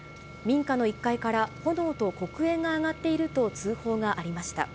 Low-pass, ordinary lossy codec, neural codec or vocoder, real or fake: none; none; none; real